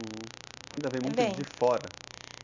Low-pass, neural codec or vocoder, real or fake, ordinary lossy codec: 7.2 kHz; none; real; none